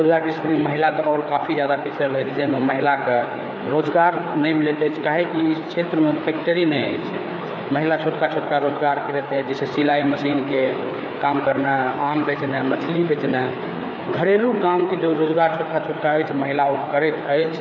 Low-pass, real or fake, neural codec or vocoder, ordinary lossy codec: none; fake; codec, 16 kHz, 4 kbps, FreqCodec, larger model; none